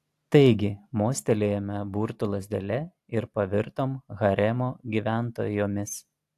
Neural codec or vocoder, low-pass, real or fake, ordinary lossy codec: none; 14.4 kHz; real; AAC, 64 kbps